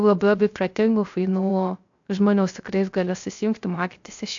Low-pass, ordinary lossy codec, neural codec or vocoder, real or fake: 7.2 kHz; AAC, 64 kbps; codec, 16 kHz, 0.3 kbps, FocalCodec; fake